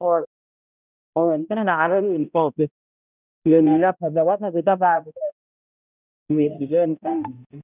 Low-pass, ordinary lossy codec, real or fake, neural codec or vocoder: 3.6 kHz; none; fake; codec, 16 kHz, 0.5 kbps, X-Codec, HuBERT features, trained on balanced general audio